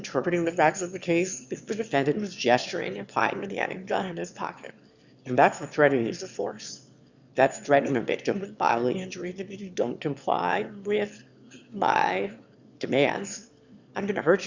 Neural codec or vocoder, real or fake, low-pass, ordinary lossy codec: autoencoder, 22.05 kHz, a latent of 192 numbers a frame, VITS, trained on one speaker; fake; 7.2 kHz; Opus, 64 kbps